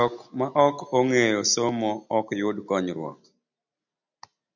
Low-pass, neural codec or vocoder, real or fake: 7.2 kHz; none; real